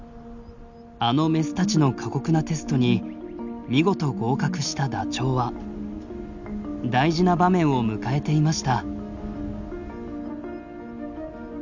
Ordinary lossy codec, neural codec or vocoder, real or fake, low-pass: none; none; real; 7.2 kHz